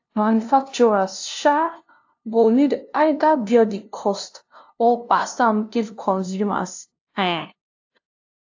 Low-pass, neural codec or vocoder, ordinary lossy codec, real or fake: 7.2 kHz; codec, 16 kHz, 0.5 kbps, FunCodec, trained on LibriTTS, 25 frames a second; AAC, 48 kbps; fake